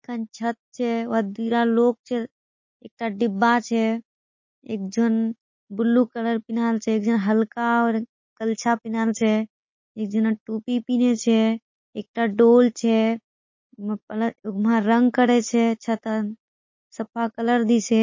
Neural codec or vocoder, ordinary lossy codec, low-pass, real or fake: none; MP3, 32 kbps; 7.2 kHz; real